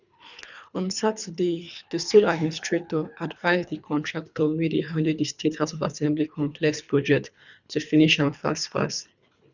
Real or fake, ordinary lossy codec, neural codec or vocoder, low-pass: fake; none; codec, 24 kHz, 3 kbps, HILCodec; 7.2 kHz